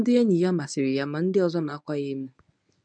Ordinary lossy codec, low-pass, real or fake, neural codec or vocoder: none; 9.9 kHz; fake; codec, 24 kHz, 0.9 kbps, WavTokenizer, medium speech release version 1